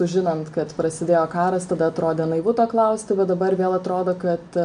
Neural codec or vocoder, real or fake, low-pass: none; real; 9.9 kHz